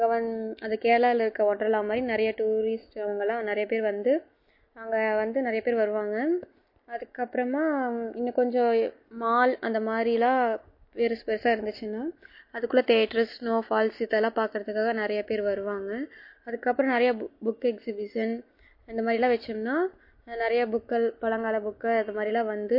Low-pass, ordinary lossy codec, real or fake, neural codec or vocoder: 5.4 kHz; MP3, 32 kbps; real; none